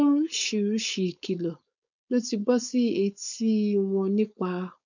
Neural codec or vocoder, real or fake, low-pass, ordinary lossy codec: codec, 16 kHz, 4.8 kbps, FACodec; fake; 7.2 kHz; none